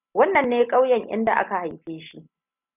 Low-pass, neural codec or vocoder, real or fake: 3.6 kHz; none; real